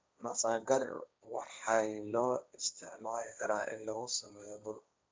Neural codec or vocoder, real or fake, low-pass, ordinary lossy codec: codec, 16 kHz, 1.1 kbps, Voila-Tokenizer; fake; none; none